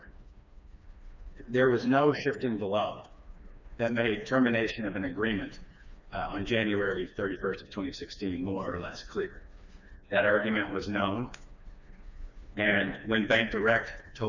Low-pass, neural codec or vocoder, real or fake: 7.2 kHz; codec, 16 kHz, 2 kbps, FreqCodec, smaller model; fake